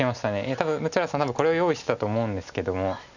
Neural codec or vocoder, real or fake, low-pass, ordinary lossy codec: none; real; 7.2 kHz; none